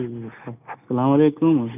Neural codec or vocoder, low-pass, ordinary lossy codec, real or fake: none; 3.6 kHz; none; real